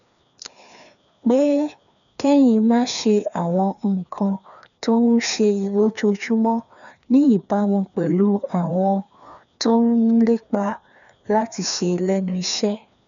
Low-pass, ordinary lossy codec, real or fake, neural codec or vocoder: 7.2 kHz; none; fake; codec, 16 kHz, 2 kbps, FreqCodec, larger model